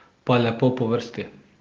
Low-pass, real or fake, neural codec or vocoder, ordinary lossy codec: 7.2 kHz; real; none; Opus, 16 kbps